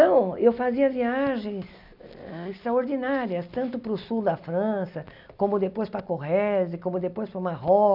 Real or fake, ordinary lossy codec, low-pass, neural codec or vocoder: real; none; 5.4 kHz; none